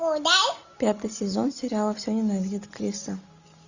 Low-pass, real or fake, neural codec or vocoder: 7.2 kHz; real; none